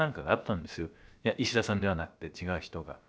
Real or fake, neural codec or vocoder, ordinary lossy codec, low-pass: fake; codec, 16 kHz, about 1 kbps, DyCAST, with the encoder's durations; none; none